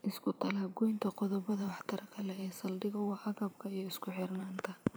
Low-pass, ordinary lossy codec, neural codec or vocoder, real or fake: none; none; vocoder, 44.1 kHz, 128 mel bands every 512 samples, BigVGAN v2; fake